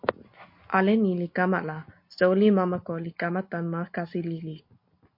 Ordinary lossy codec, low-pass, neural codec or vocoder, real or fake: MP3, 32 kbps; 5.4 kHz; none; real